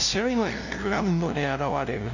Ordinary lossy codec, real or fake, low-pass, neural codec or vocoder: none; fake; 7.2 kHz; codec, 16 kHz, 0.5 kbps, FunCodec, trained on LibriTTS, 25 frames a second